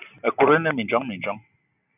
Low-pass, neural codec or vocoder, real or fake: 3.6 kHz; none; real